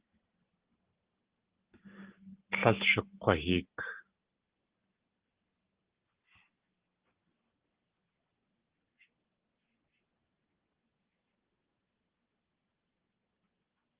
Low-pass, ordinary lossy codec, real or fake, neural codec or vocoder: 3.6 kHz; Opus, 16 kbps; real; none